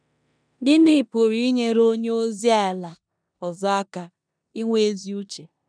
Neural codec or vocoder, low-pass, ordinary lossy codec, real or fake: codec, 16 kHz in and 24 kHz out, 0.9 kbps, LongCat-Audio-Codec, four codebook decoder; 9.9 kHz; none; fake